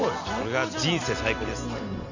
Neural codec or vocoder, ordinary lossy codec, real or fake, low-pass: none; none; real; 7.2 kHz